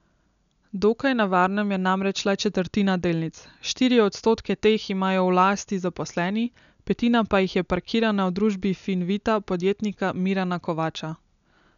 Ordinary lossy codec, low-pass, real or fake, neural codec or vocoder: none; 7.2 kHz; real; none